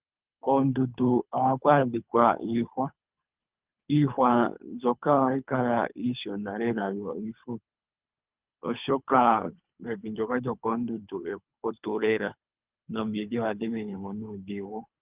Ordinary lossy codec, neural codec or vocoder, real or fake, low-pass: Opus, 32 kbps; codec, 24 kHz, 3 kbps, HILCodec; fake; 3.6 kHz